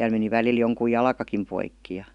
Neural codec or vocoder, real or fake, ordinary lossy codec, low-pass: none; real; none; 10.8 kHz